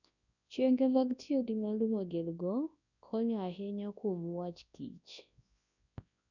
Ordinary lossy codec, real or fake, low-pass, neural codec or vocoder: none; fake; 7.2 kHz; codec, 24 kHz, 0.9 kbps, WavTokenizer, large speech release